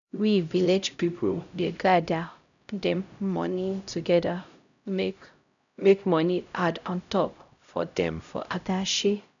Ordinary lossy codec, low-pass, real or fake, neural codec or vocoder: none; 7.2 kHz; fake; codec, 16 kHz, 0.5 kbps, X-Codec, HuBERT features, trained on LibriSpeech